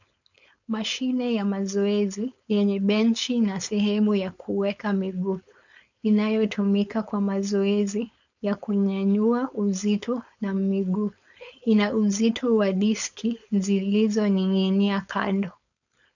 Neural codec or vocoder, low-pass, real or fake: codec, 16 kHz, 4.8 kbps, FACodec; 7.2 kHz; fake